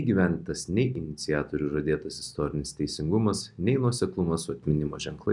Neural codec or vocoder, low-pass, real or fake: none; 10.8 kHz; real